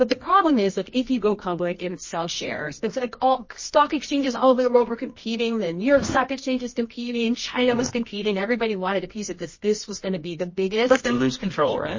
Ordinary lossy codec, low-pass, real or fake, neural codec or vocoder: MP3, 32 kbps; 7.2 kHz; fake; codec, 24 kHz, 0.9 kbps, WavTokenizer, medium music audio release